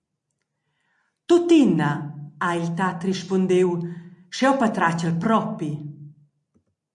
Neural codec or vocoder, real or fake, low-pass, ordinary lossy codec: none; real; 10.8 kHz; MP3, 48 kbps